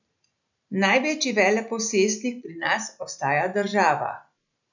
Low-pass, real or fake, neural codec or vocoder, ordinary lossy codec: 7.2 kHz; real; none; none